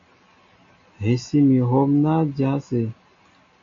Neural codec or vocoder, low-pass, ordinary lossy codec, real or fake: none; 7.2 kHz; AAC, 64 kbps; real